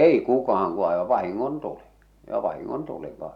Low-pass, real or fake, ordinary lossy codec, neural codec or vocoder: 19.8 kHz; real; none; none